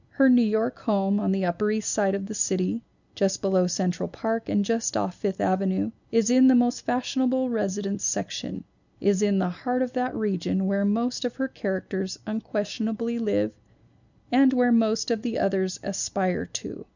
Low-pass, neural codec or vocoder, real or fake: 7.2 kHz; none; real